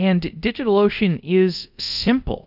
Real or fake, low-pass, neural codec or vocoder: fake; 5.4 kHz; codec, 24 kHz, 0.5 kbps, DualCodec